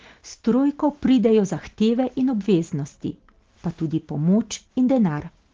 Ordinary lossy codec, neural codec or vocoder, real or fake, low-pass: Opus, 16 kbps; none; real; 7.2 kHz